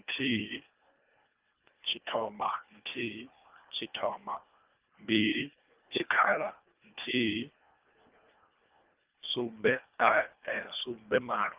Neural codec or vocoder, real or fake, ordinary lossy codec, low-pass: codec, 24 kHz, 1.5 kbps, HILCodec; fake; Opus, 24 kbps; 3.6 kHz